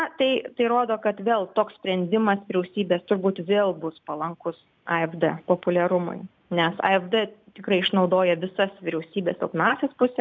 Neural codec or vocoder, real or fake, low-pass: none; real; 7.2 kHz